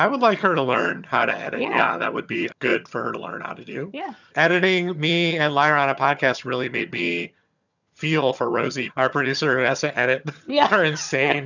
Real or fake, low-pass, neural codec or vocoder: fake; 7.2 kHz; vocoder, 22.05 kHz, 80 mel bands, HiFi-GAN